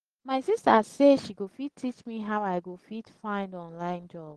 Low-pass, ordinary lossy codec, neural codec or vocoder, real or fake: 10.8 kHz; Opus, 16 kbps; none; real